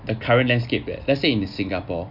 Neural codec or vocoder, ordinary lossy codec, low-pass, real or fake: none; none; 5.4 kHz; real